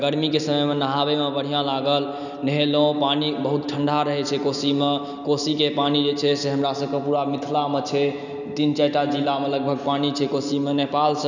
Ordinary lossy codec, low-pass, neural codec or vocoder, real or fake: none; 7.2 kHz; none; real